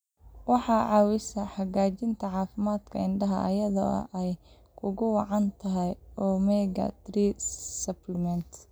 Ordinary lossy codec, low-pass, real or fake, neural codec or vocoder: none; none; real; none